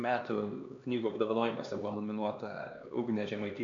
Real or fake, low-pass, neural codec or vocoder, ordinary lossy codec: fake; 7.2 kHz; codec, 16 kHz, 2 kbps, X-Codec, HuBERT features, trained on LibriSpeech; MP3, 64 kbps